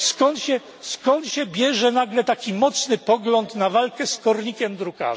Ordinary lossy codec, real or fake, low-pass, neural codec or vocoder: none; real; none; none